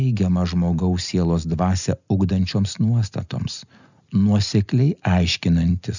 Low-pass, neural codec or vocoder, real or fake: 7.2 kHz; none; real